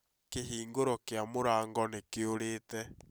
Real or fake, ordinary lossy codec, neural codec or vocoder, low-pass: real; none; none; none